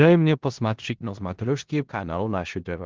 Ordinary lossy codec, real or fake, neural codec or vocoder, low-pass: Opus, 16 kbps; fake; codec, 16 kHz in and 24 kHz out, 0.4 kbps, LongCat-Audio-Codec, four codebook decoder; 7.2 kHz